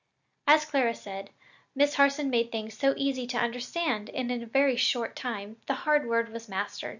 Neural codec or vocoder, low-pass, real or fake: none; 7.2 kHz; real